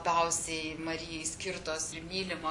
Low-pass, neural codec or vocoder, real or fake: 10.8 kHz; none; real